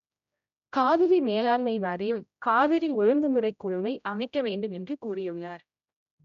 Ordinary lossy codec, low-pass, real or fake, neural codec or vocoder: none; 7.2 kHz; fake; codec, 16 kHz, 0.5 kbps, X-Codec, HuBERT features, trained on general audio